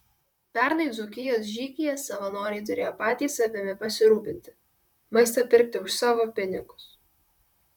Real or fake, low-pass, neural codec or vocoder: fake; 19.8 kHz; vocoder, 44.1 kHz, 128 mel bands, Pupu-Vocoder